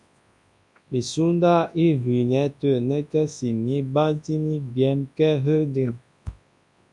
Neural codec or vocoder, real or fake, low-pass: codec, 24 kHz, 0.9 kbps, WavTokenizer, large speech release; fake; 10.8 kHz